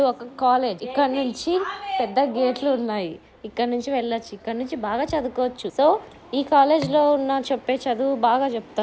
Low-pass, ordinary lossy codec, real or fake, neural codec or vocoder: none; none; real; none